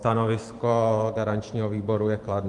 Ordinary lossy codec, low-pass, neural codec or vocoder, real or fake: Opus, 24 kbps; 10.8 kHz; vocoder, 44.1 kHz, 128 mel bands every 512 samples, BigVGAN v2; fake